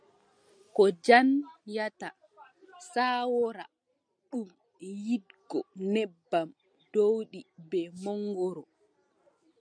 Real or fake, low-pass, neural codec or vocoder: real; 9.9 kHz; none